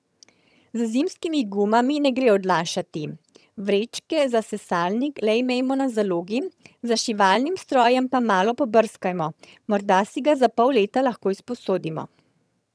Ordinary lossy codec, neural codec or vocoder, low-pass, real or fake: none; vocoder, 22.05 kHz, 80 mel bands, HiFi-GAN; none; fake